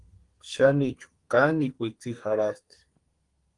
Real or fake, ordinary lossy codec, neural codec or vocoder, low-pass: fake; Opus, 24 kbps; codec, 32 kHz, 1.9 kbps, SNAC; 10.8 kHz